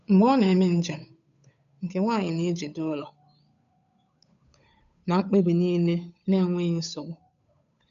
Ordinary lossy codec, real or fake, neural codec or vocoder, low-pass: none; fake; codec, 16 kHz, 8 kbps, FunCodec, trained on Chinese and English, 25 frames a second; 7.2 kHz